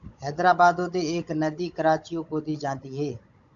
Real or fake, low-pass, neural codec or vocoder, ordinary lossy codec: fake; 7.2 kHz; codec, 16 kHz, 16 kbps, FunCodec, trained on Chinese and English, 50 frames a second; MP3, 64 kbps